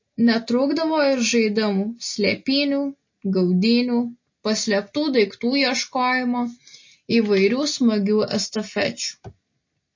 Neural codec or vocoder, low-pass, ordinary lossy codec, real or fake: none; 7.2 kHz; MP3, 32 kbps; real